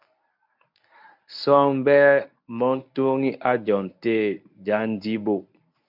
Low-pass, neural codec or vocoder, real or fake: 5.4 kHz; codec, 24 kHz, 0.9 kbps, WavTokenizer, medium speech release version 1; fake